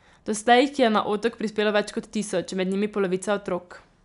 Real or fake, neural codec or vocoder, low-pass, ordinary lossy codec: real; none; 10.8 kHz; none